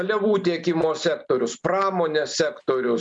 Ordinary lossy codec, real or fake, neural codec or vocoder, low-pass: MP3, 96 kbps; real; none; 10.8 kHz